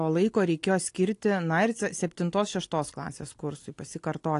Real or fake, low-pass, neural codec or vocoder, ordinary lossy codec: real; 10.8 kHz; none; AAC, 48 kbps